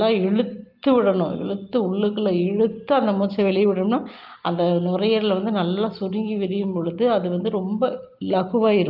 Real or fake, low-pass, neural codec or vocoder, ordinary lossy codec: real; 5.4 kHz; none; Opus, 32 kbps